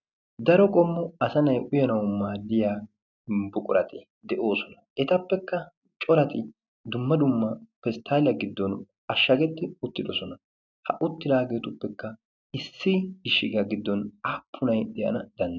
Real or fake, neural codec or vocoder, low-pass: real; none; 7.2 kHz